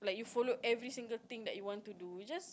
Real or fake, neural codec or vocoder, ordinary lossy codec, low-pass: real; none; none; none